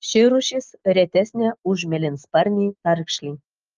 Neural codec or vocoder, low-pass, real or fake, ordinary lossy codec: none; 7.2 kHz; real; Opus, 24 kbps